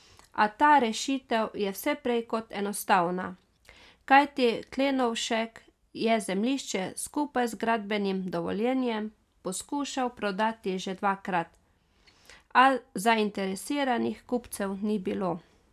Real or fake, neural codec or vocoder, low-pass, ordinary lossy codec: real; none; 14.4 kHz; none